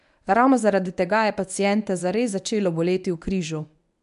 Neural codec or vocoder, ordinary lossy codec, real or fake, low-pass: codec, 24 kHz, 0.9 kbps, WavTokenizer, medium speech release version 1; none; fake; 10.8 kHz